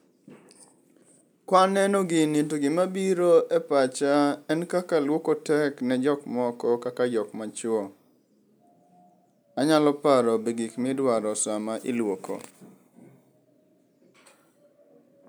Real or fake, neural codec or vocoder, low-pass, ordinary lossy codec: real; none; none; none